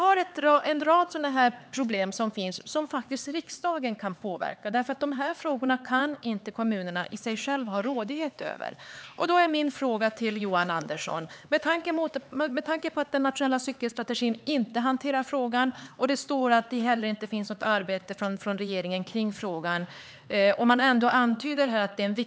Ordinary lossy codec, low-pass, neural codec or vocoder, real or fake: none; none; codec, 16 kHz, 4 kbps, X-Codec, HuBERT features, trained on LibriSpeech; fake